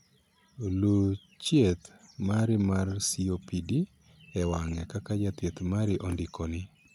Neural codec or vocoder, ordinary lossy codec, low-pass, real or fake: none; none; 19.8 kHz; real